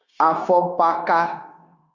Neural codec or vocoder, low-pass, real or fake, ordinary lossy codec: codec, 16 kHz, 0.9 kbps, LongCat-Audio-Codec; 7.2 kHz; fake; Opus, 64 kbps